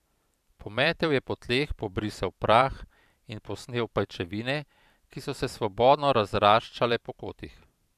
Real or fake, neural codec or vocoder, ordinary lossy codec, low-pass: fake; vocoder, 44.1 kHz, 128 mel bands every 512 samples, BigVGAN v2; none; 14.4 kHz